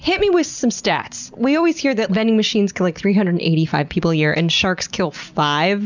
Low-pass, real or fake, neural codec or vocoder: 7.2 kHz; real; none